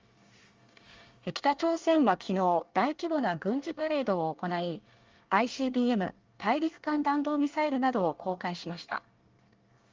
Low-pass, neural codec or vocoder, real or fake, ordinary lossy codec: 7.2 kHz; codec, 24 kHz, 1 kbps, SNAC; fake; Opus, 32 kbps